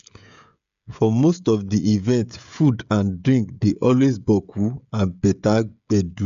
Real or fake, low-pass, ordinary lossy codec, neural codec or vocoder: fake; 7.2 kHz; MP3, 64 kbps; codec, 16 kHz, 16 kbps, FreqCodec, smaller model